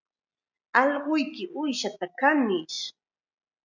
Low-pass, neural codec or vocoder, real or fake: 7.2 kHz; none; real